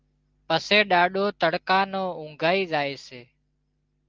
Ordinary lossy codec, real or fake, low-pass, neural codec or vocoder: Opus, 32 kbps; real; 7.2 kHz; none